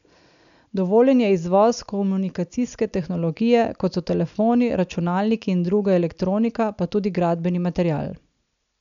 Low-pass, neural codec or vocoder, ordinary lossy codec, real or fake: 7.2 kHz; none; none; real